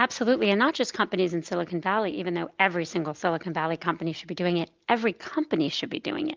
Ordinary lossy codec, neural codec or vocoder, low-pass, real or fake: Opus, 32 kbps; none; 7.2 kHz; real